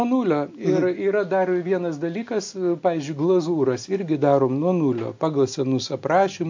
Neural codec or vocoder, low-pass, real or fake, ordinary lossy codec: none; 7.2 kHz; real; MP3, 48 kbps